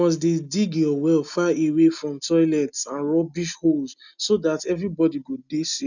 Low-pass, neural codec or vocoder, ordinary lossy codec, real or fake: 7.2 kHz; none; none; real